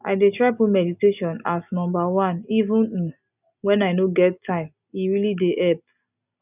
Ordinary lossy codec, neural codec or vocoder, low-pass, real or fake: none; none; 3.6 kHz; real